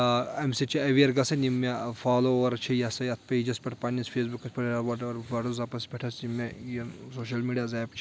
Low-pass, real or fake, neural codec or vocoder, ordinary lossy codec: none; real; none; none